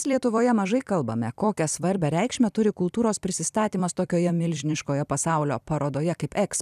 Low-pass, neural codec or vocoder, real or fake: 14.4 kHz; vocoder, 44.1 kHz, 128 mel bands every 256 samples, BigVGAN v2; fake